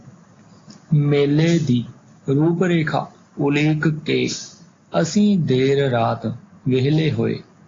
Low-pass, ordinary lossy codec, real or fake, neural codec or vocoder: 7.2 kHz; AAC, 32 kbps; real; none